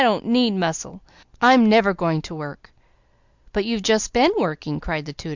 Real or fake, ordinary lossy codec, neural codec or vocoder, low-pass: real; Opus, 64 kbps; none; 7.2 kHz